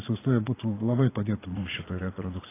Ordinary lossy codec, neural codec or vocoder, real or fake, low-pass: AAC, 16 kbps; vocoder, 22.05 kHz, 80 mel bands, Vocos; fake; 3.6 kHz